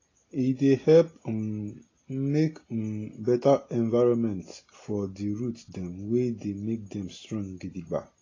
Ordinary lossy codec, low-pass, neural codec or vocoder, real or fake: AAC, 32 kbps; 7.2 kHz; none; real